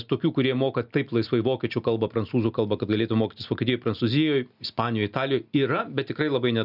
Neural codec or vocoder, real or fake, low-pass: none; real; 5.4 kHz